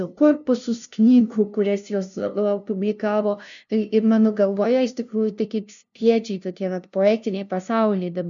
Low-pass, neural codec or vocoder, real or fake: 7.2 kHz; codec, 16 kHz, 0.5 kbps, FunCodec, trained on LibriTTS, 25 frames a second; fake